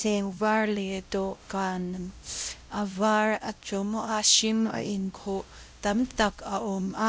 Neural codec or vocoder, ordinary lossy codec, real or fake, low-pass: codec, 16 kHz, 0.5 kbps, X-Codec, WavLM features, trained on Multilingual LibriSpeech; none; fake; none